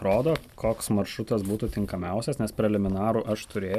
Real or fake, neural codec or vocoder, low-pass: real; none; 14.4 kHz